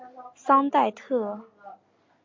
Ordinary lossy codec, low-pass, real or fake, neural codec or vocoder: MP3, 48 kbps; 7.2 kHz; real; none